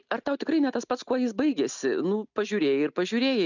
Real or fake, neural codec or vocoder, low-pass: real; none; 7.2 kHz